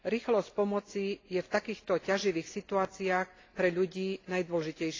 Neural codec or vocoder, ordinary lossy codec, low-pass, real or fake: none; AAC, 32 kbps; 7.2 kHz; real